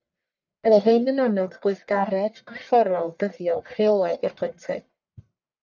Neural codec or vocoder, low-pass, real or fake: codec, 44.1 kHz, 1.7 kbps, Pupu-Codec; 7.2 kHz; fake